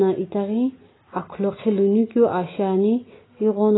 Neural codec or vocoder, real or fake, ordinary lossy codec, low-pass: none; real; AAC, 16 kbps; 7.2 kHz